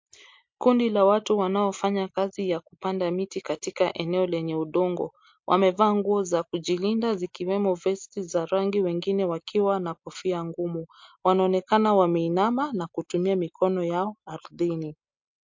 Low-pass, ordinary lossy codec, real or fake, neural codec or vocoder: 7.2 kHz; MP3, 48 kbps; real; none